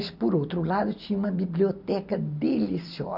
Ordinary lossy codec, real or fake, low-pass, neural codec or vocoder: none; real; 5.4 kHz; none